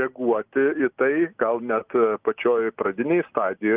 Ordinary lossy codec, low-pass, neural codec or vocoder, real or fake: Opus, 24 kbps; 3.6 kHz; none; real